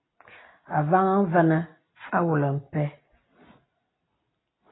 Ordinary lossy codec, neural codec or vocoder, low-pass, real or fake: AAC, 16 kbps; none; 7.2 kHz; real